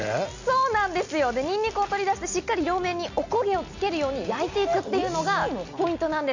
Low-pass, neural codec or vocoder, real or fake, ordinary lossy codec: 7.2 kHz; none; real; Opus, 64 kbps